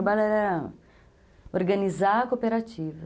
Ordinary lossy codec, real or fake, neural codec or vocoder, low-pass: none; real; none; none